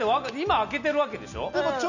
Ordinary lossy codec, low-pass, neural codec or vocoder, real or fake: none; 7.2 kHz; none; real